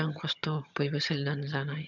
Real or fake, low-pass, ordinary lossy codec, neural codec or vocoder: fake; 7.2 kHz; none; vocoder, 22.05 kHz, 80 mel bands, HiFi-GAN